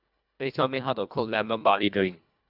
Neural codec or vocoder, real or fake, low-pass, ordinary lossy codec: codec, 24 kHz, 1.5 kbps, HILCodec; fake; 5.4 kHz; none